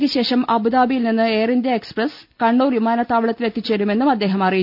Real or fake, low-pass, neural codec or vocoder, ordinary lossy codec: real; 5.4 kHz; none; none